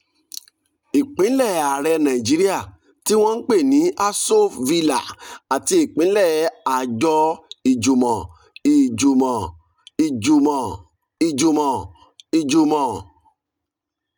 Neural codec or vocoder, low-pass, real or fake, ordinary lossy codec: none; none; real; none